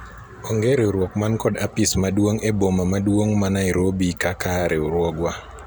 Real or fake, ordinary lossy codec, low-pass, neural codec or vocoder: real; none; none; none